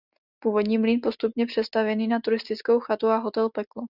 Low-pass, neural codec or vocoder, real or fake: 5.4 kHz; none; real